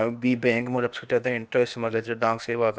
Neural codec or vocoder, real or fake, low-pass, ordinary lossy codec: codec, 16 kHz, 0.8 kbps, ZipCodec; fake; none; none